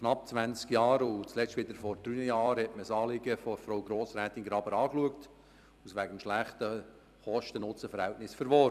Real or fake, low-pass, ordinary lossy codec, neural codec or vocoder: fake; 14.4 kHz; none; vocoder, 44.1 kHz, 128 mel bands every 256 samples, BigVGAN v2